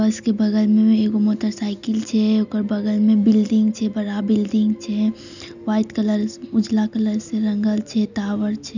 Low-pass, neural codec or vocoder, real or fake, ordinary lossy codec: 7.2 kHz; none; real; none